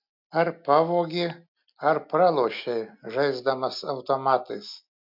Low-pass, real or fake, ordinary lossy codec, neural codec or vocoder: 5.4 kHz; real; MP3, 48 kbps; none